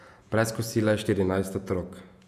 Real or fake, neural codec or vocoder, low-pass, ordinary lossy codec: fake; vocoder, 48 kHz, 128 mel bands, Vocos; 14.4 kHz; none